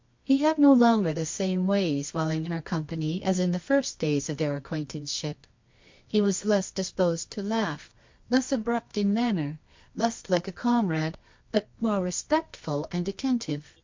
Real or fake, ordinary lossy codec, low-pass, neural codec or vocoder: fake; MP3, 48 kbps; 7.2 kHz; codec, 24 kHz, 0.9 kbps, WavTokenizer, medium music audio release